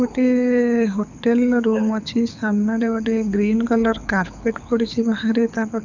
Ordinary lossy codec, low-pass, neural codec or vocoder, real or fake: none; 7.2 kHz; codec, 24 kHz, 6 kbps, HILCodec; fake